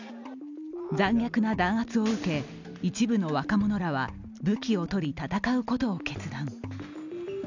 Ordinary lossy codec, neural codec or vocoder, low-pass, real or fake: none; none; 7.2 kHz; real